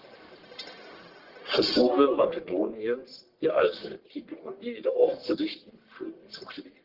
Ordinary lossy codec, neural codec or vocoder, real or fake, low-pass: Opus, 16 kbps; codec, 44.1 kHz, 1.7 kbps, Pupu-Codec; fake; 5.4 kHz